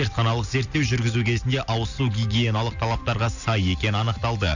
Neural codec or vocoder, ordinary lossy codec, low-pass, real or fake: none; none; 7.2 kHz; real